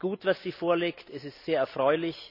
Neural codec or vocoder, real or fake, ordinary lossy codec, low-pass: none; real; none; 5.4 kHz